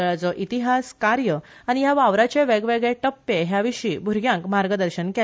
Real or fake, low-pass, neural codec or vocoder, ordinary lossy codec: real; none; none; none